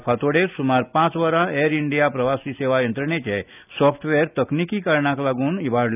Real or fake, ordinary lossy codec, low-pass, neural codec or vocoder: real; none; 3.6 kHz; none